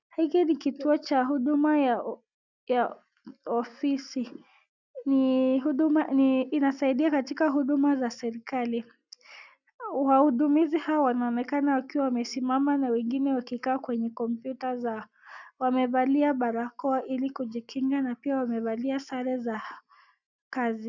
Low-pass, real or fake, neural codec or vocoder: 7.2 kHz; real; none